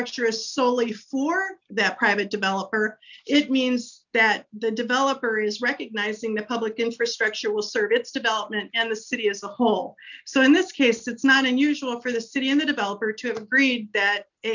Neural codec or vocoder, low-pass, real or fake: none; 7.2 kHz; real